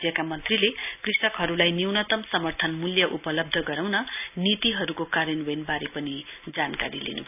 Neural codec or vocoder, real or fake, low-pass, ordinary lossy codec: none; real; 3.6 kHz; none